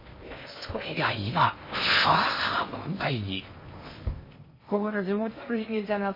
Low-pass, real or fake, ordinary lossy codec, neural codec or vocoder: 5.4 kHz; fake; MP3, 24 kbps; codec, 16 kHz in and 24 kHz out, 0.6 kbps, FocalCodec, streaming, 2048 codes